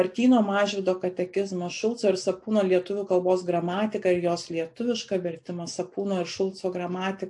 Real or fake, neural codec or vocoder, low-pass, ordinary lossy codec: real; none; 10.8 kHz; AAC, 64 kbps